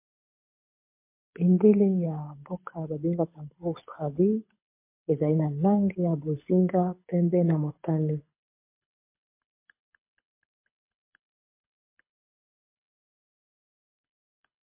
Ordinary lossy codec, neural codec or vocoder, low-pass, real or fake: MP3, 24 kbps; codec, 24 kHz, 6 kbps, HILCodec; 3.6 kHz; fake